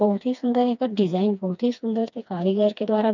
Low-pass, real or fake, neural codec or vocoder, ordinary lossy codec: 7.2 kHz; fake; codec, 16 kHz, 2 kbps, FreqCodec, smaller model; none